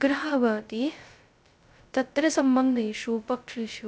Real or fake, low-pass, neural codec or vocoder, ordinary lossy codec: fake; none; codec, 16 kHz, 0.2 kbps, FocalCodec; none